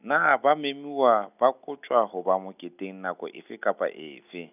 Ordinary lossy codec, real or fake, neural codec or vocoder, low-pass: none; real; none; 3.6 kHz